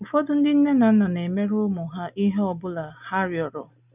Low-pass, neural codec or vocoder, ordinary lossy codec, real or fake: 3.6 kHz; none; none; real